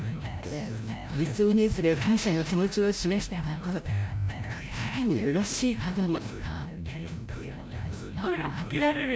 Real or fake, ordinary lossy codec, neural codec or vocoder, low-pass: fake; none; codec, 16 kHz, 0.5 kbps, FreqCodec, larger model; none